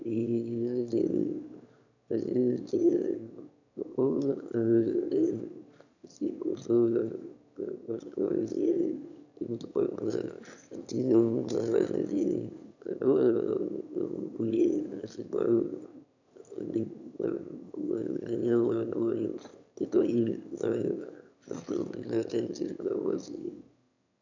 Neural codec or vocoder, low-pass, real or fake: autoencoder, 22.05 kHz, a latent of 192 numbers a frame, VITS, trained on one speaker; 7.2 kHz; fake